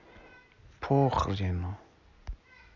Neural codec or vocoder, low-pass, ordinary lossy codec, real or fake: none; 7.2 kHz; none; real